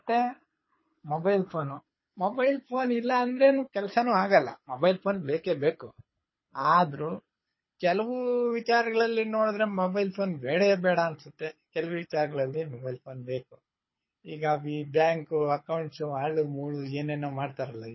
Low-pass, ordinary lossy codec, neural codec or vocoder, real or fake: 7.2 kHz; MP3, 24 kbps; codec, 24 kHz, 6 kbps, HILCodec; fake